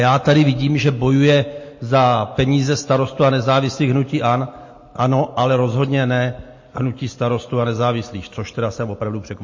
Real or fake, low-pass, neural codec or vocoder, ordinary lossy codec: real; 7.2 kHz; none; MP3, 32 kbps